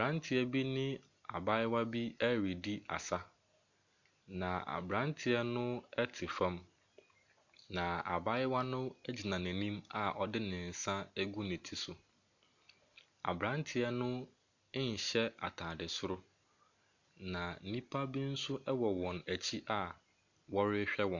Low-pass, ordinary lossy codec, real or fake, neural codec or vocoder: 7.2 kHz; MP3, 64 kbps; real; none